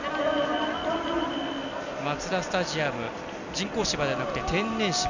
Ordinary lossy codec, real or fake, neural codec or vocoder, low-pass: none; real; none; 7.2 kHz